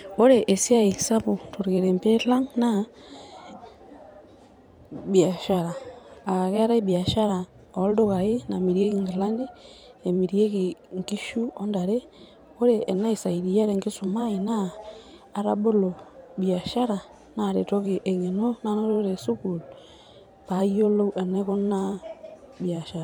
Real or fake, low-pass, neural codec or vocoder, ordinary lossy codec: fake; 19.8 kHz; vocoder, 44.1 kHz, 128 mel bands every 512 samples, BigVGAN v2; MP3, 96 kbps